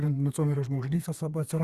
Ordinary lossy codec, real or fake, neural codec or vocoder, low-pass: Opus, 64 kbps; fake; codec, 32 kHz, 1.9 kbps, SNAC; 14.4 kHz